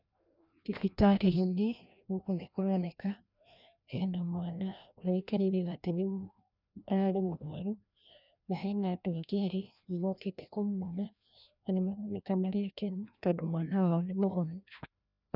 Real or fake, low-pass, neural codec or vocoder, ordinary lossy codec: fake; 5.4 kHz; codec, 16 kHz, 1 kbps, FreqCodec, larger model; none